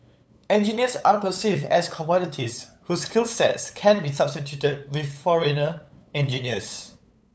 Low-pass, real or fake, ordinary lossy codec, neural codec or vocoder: none; fake; none; codec, 16 kHz, 8 kbps, FunCodec, trained on LibriTTS, 25 frames a second